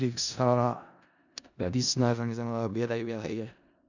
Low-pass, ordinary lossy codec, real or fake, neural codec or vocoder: 7.2 kHz; none; fake; codec, 16 kHz in and 24 kHz out, 0.4 kbps, LongCat-Audio-Codec, four codebook decoder